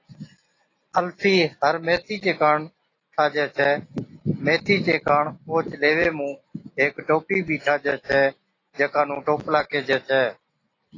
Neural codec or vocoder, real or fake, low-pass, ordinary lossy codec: none; real; 7.2 kHz; AAC, 32 kbps